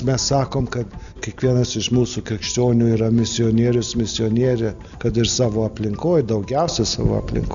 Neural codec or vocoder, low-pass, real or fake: none; 7.2 kHz; real